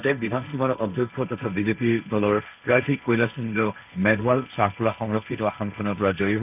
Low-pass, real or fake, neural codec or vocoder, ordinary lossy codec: 3.6 kHz; fake; codec, 16 kHz, 1.1 kbps, Voila-Tokenizer; none